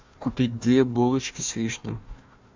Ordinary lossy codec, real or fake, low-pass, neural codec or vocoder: MP3, 64 kbps; fake; 7.2 kHz; codec, 16 kHz, 1 kbps, FunCodec, trained on Chinese and English, 50 frames a second